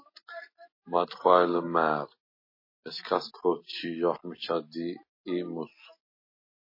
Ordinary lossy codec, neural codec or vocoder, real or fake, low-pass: MP3, 24 kbps; none; real; 5.4 kHz